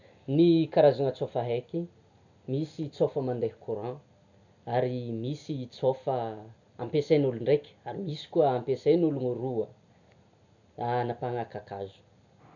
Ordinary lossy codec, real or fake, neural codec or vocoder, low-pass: none; real; none; 7.2 kHz